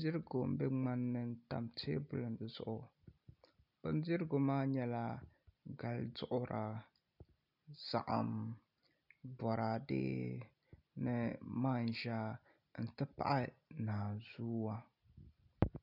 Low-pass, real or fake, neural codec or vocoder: 5.4 kHz; real; none